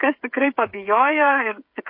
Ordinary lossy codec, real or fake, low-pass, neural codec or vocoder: MP3, 32 kbps; fake; 5.4 kHz; codec, 16 kHz, 16 kbps, FreqCodec, smaller model